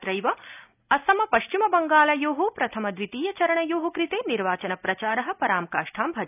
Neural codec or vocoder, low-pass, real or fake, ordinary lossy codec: none; 3.6 kHz; real; none